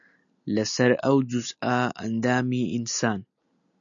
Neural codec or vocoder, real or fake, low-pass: none; real; 7.2 kHz